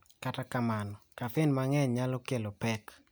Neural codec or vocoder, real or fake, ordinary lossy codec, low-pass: none; real; none; none